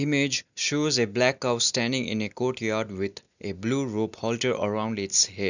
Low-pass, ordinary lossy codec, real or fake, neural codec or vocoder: 7.2 kHz; none; real; none